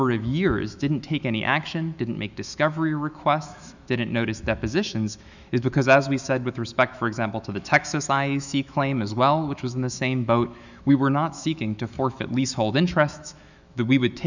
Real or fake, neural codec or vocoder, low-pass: fake; autoencoder, 48 kHz, 128 numbers a frame, DAC-VAE, trained on Japanese speech; 7.2 kHz